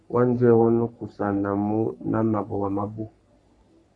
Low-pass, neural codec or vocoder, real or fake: 10.8 kHz; codec, 44.1 kHz, 3.4 kbps, Pupu-Codec; fake